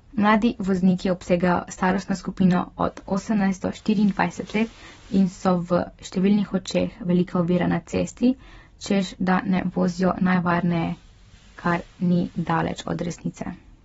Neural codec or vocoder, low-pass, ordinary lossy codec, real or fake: vocoder, 44.1 kHz, 128 mel bands every 256 samples, BigVGAN v2; 19.8 kHz; AAC, 24 kbps; fake